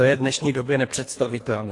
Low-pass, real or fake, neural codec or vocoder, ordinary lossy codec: 10.8 kHz; fake; codec, 24 kHz, 1.5 kbps, HILCodec; AAC, 48 kbps